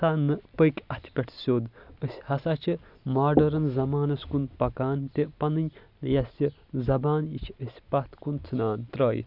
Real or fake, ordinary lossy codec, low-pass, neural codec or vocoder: real; none; 5.4 kHz; none